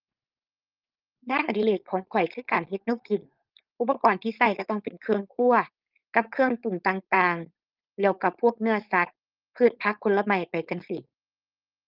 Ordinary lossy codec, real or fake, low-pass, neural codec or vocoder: Opus, 32 kbps; fake; 5.4 kHz; codec, 16 kHz, 4.8 kbps, FACodec